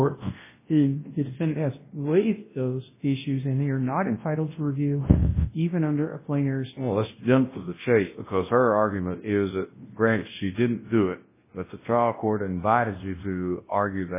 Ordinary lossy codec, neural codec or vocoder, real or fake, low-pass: MP3, 16 kbps; codec, 24 kHz, 0.9 kbps, WavTokenizer, large speech release; fake; 3.6 kHz